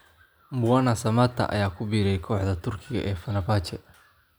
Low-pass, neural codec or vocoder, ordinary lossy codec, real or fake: none; none; none; real